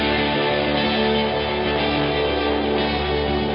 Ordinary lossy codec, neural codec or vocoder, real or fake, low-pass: MP3, 24 kbps; none; real; 7.2 kHz